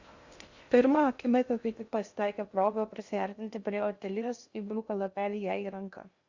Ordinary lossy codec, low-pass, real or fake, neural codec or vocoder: Opus, 64 kbps; 7.2 kHz; fake; codec, 16 kHz in and 24 kHz out, 0.6 kbps, FocalCodec, streaming, 2048 codes